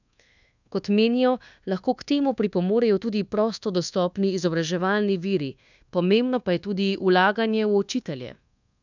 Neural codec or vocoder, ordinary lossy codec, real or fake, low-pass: codec, 24 kHz, 1.2 kbps, DualCodec; none; fake; 7.2 kHz